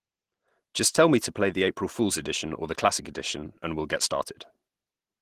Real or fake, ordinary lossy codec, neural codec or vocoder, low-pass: real; Opus, 16 kbps; none; 14.4 kHz